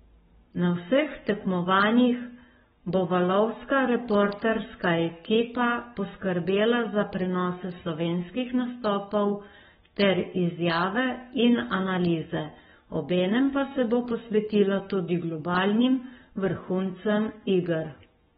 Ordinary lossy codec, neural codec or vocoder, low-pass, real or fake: AAC, 16 kbps; codec, 44.1 kHz, 7.8 kbps, Pupu-Codec; 19.8 kHz; fake